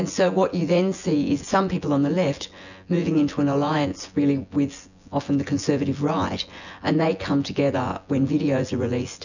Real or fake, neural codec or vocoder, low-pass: fake; vocoder, 24 kHz, 100 mel bands, Vocos; 7.2 kHz